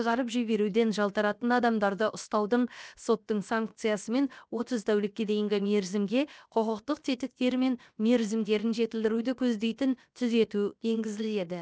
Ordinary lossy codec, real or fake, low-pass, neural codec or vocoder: none; fake; none; codec, 16 kHz, 0.7 kbps, FocalCodec